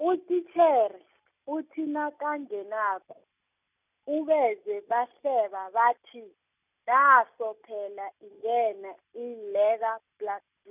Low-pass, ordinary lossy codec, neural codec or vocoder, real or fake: 3.6 kHz; none; none; real